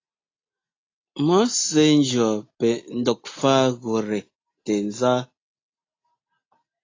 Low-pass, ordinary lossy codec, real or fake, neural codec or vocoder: 7.2 kHz; AAC, 32 kbps; real; none